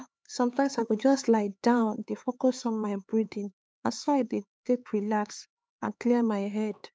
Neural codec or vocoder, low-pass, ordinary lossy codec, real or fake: codec, 16 kHz, 4 kbps, X-Codec, HuBERT features, trained on LibriSpeech; none; none; fake